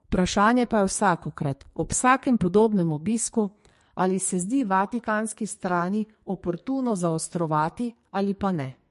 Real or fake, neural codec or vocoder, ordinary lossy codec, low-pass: fake; codec, 44.1 kHz, 2.6 kbps, SNAC; MP3, 48 kbps; 14.4 kHz